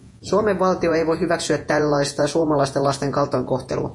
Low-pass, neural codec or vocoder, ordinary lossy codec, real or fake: 10.8 kHz; vocoder, 48 kHz, 128 mel bands, Vocos; MP3, 48 kbps; fake